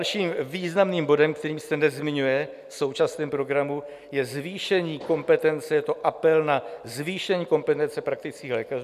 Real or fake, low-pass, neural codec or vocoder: real; 14.4 kHz; none